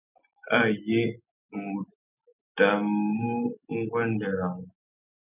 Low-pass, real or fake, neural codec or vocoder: 3.6 kHz; real; none